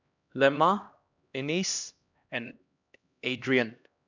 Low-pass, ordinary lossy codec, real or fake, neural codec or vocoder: 7.2 kHz; none; fake; codec, 16 kHz, 1 kbps, X-Codec, HuBERT features, trained on LibriSpeech